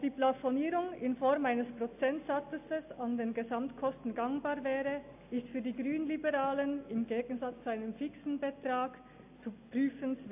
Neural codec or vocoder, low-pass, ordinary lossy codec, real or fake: none; 3.6 kHz; AAC, 32 kbps; real